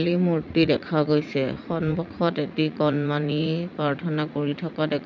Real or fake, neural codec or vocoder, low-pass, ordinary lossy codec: fake; vocoder, 44.1 kHz, 80 mel bands, Vocos; 7.2 kHz; none